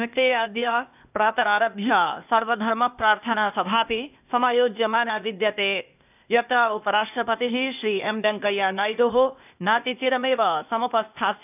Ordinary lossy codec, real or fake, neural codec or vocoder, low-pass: none; fake; codec, 16 kHz, 0.8 kbps, ZipCodec; 3.6 kHz